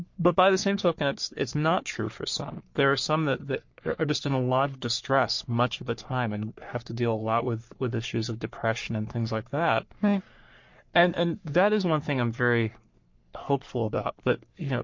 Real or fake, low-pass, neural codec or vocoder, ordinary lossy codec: fake; 7.2 kHz; codec, 44.1 kHz, 3.4 kbps, Pupu-Codec; MP3, 48 kbps